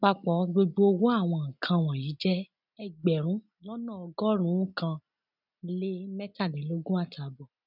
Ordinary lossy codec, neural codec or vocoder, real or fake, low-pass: none; none; real; 5.4 kHz